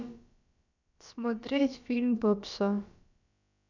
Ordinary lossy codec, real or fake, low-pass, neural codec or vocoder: none; fake; 7.2 kHz; codec, 16 kHz, about 1 kbps, DyCAST, with the encoder's durations